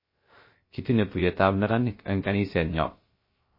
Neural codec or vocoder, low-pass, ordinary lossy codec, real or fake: codec, 16 kHz, 0.3 kbps, FocalCodec; 5.4 kHz; MP3, 24 kbps; fake